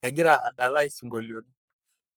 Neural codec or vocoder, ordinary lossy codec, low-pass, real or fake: codec, 44.1 kHz, 3.4 kbps, Pupu-Codec; none; none; fake